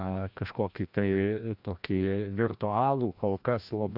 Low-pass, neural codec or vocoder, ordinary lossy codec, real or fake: 5.4 kHz; codec, 16 kHz, 1 kbps, FreqCodec, larger model; AAC, 48 kbps; fake